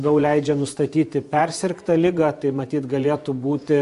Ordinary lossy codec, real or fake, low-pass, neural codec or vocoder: MP3, 64 kbps; fake; 10.8 kHz; vocoder, 24 kHz, 100 mel bands, Vocos